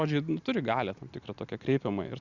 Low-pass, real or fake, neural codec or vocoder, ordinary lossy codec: 7.2 kHz; real; none; Opus, 64 kbps